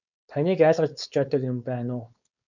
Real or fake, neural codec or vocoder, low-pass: fake; codec, 16 kHz, 4.8 kbps, FACodec; 7.2 kHz